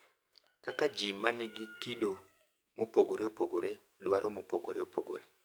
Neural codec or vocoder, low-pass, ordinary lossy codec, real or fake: codec, 44.1 kHz, 2.6 kbps, SNAC; none; none; fake